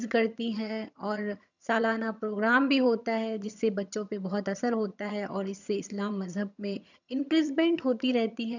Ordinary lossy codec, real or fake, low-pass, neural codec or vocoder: none; fake; 7.2 kHz; vocoder, 22.05 kHz, 80 mel bands, HiFi-GAN